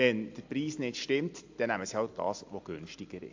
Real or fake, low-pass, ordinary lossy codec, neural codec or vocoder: real; 7.2 kHz; AAC, 48 kbps; none